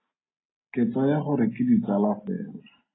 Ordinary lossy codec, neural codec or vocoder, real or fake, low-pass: AAC, 16 kbps; none; real; 7.2 kHz